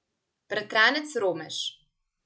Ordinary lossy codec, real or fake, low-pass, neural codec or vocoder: none; real; none; none